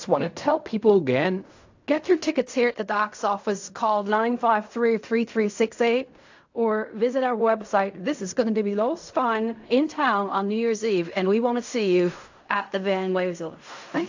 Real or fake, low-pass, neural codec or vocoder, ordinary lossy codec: fake; 7.2 kHz; codec, 16 kHz in and 24 kHz out, 0.4 kbps, LongCat-Audio-Codec, fine tuned four codebook decoder; AAC, 48 kbps